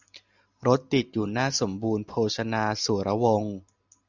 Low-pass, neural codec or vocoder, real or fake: 7.2 kHz; none; real